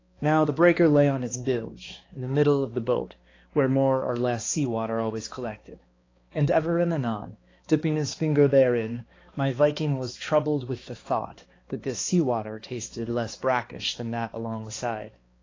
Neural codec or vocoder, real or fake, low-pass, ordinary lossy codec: codec, 16 kHz, 2 kbps, X-Codec, HuBERT features, trained on balanced general audio; fake; 7.2 kHz; AAC, 32 kbps